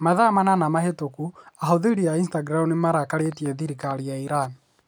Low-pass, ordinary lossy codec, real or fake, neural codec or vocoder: none; none; real; none